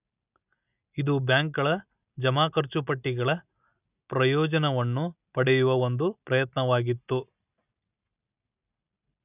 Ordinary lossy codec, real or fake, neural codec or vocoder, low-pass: none; real; none; 3.6 kHz